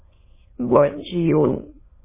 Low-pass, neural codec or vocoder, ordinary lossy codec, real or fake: 3.6 kHz; autoencoder, 22.05 kHz, a latent of 192 numbers a frame, VITS, trained on many speakers; MP3, 16 kbps; fake